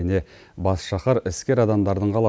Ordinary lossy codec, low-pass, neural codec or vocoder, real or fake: none; none; none; real